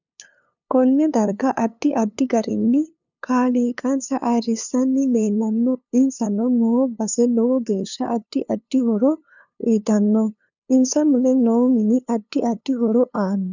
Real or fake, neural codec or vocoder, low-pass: fake; codec, 16 kHz, 2 kbps, FunCodec, trained on LibriTTS, 25 frames a second; 7.2 kHz